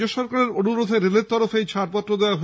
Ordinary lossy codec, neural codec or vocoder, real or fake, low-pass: none; none; real; none